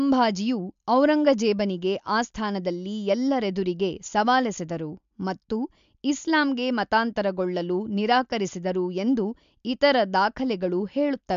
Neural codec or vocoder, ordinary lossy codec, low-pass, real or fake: none; MP3, 64 kbps; 7.2 kHz; real